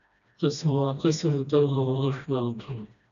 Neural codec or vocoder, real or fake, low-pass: codec, 16 kHz, 1 kbps, FreqCodec, smaller model; fake; 7.2 kHz